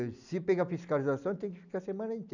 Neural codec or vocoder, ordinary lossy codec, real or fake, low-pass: none; none; real; 7.2 kHz